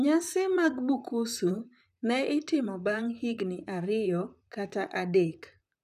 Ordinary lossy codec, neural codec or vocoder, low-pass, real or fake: none; vocoder, 44.1 kHz, 128 mel bands, Pupu-Vocoder; 14.4 kHz; fake